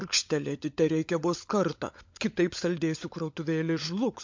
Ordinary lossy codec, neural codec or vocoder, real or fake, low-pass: MP3, 48 kbps; codec, 16 kHz, 16 kbps, FunCodec, trained on LibriTTS, 50 frames a second; fake; 7.2 kHz